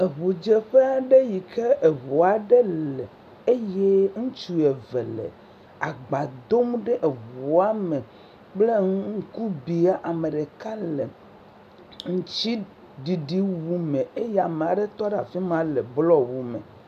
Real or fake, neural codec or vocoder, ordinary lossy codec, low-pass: real; none; AAC, 96 kbps; 14.4 kHz